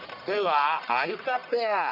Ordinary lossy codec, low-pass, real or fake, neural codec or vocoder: none; 5.4 kHz; fake; codec, 44.1 kHz, 1.7 kbps, Pupu-Codec